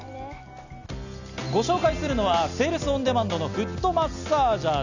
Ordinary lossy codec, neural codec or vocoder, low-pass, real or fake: none; none; 7.2 kHz; real